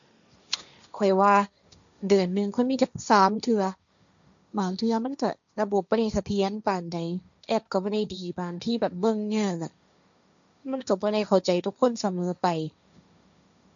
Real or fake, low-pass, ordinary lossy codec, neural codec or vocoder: fake; 7.2 kHz; none; codec, 16 kHz, 1.1 kbps, Voila-Tokenizer